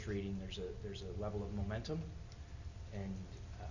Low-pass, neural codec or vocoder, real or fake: 7.2 kHz; none; real